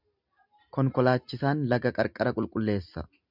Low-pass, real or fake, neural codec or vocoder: 5.4 kHz; real; none